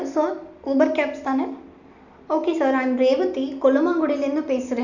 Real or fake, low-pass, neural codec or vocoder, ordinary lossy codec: real; 7.2 kHz; none; none